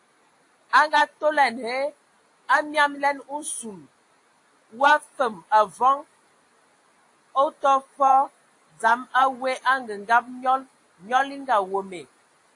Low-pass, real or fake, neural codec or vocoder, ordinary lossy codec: 10.8 kHz; real; none; AAC, 48 kbps